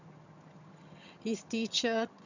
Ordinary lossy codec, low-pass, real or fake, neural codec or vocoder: none; 7.2 kHz; fake; vocoder, 22.05 kHz, 80 mel bands, HiFi-GAN